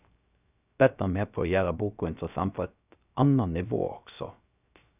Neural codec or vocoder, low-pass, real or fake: codec, 16 kHz, 0.3 kbps, FocalCodec; 3.6 kHz; fake